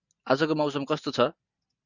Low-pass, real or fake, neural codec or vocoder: 7.2 kHz; real; none